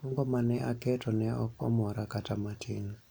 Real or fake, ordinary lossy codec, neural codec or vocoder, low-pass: real; none; none; none